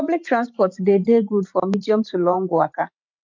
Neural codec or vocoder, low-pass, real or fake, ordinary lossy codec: vocoder, 44.1 kHz, 128 mel bands every 512 samples, BigVGAN v2; 7.2 kHz; fake; MP3, 64 kbps